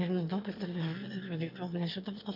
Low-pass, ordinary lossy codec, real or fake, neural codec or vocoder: 5.4 kHz; MP3, 48 kbps; fake; autoencoder, 22.05 kHz, a latent of 192 numbers a frame, VITS, trained on one speaker